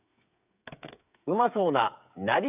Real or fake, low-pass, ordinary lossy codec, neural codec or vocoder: fake; 3.6 kHz; none; codec, 16 kHz, 8 kbps, FreqCodec, smaller model